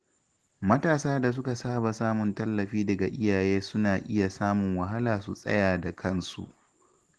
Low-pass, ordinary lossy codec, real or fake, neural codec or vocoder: 10.8 kHz; Opus, 16 kbps; real; none